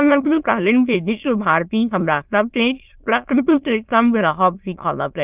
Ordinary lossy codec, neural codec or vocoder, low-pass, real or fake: Opus, 24 kbps; autoencoder, 22.05 kHz, a latent of 192 numbers a frame, VITS, trained on many speakers; 3.6 kHz; fake